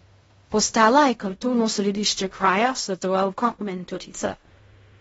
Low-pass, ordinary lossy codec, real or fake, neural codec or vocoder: 10.8 kHz; AAC, 24 kbps; fake; codec, 16 kHz in and 24 kHz out, 0.4 kbps, LongCat-Audio-Codec, fine tuned four codebook decoder